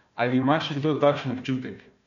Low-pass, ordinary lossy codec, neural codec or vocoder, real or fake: 7.2 kHz; none; codec, 16 kHz, 1 kbps, FunCodec, trained on Chinese and English, 50 frames a second; fake